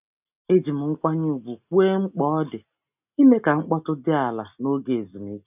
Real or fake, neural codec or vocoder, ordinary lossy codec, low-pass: real; none; none; 3.6 kHz